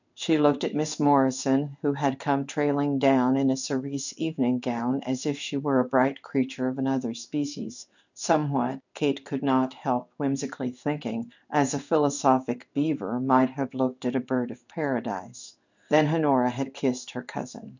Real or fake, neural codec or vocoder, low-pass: fake; codec, 16 kHz in and 24 kHz out, 1 kbps, XY-Tokenizer; 7.2 kHz